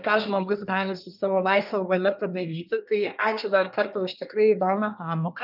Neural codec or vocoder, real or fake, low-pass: codec, 24 kHz, 1 kbps, SNAC; fake; 5.4 kHz